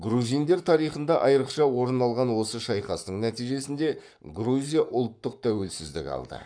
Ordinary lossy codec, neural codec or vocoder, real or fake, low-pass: none; codec, 44.1 kHz, 7.8 kbps, Pupu-Codec; fake; 9.9 kHz